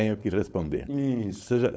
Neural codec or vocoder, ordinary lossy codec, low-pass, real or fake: codec, 16 kHz, 4.8 kbps, FACodec; none; none; fake